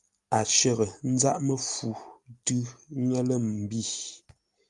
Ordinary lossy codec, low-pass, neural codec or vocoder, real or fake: Opus, 32 kbps; 10.8 kHz; none; real